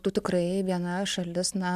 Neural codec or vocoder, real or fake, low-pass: none; real; 14.4 kHz